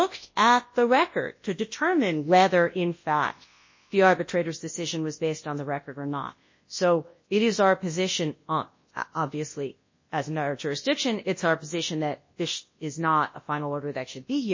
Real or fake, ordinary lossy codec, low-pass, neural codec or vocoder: fake; MP3, 32 kbps; 7.2 kHz; codec, 24 kHz, 0.9 kbps, WavTokenizer, large speech release